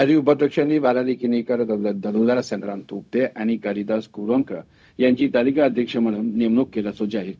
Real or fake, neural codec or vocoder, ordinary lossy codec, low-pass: fake; codec, 16 kHz, 0.4 kbps, LongCat-Audio-Codec; none; none